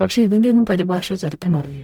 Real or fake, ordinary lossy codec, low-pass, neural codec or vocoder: fake; none; 19.8 kHz; codec, 44.1 kHz, 0.9 kbps, DAC